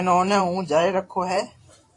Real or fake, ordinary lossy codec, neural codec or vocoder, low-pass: fake; AAC, 48 kbps; vocoder, 44.1 kHz, 128 mel bands every 512 samples, BigVGAN v2; 10.8 kHz